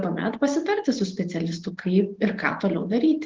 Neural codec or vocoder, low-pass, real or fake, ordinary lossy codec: none; 7.2 kHz; real; Opus, 16 kbps